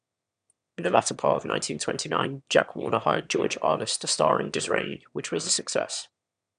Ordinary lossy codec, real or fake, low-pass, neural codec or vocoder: none; fake; 9.9 kHz; autoencoder, 22.05 kHz, a latent of 192 numbers a frame, VITS, trained on one speaker